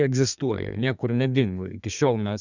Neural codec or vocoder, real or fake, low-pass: codec, 32 kHz, 1.9 kbps, SNAC; fake; 7.2 kHz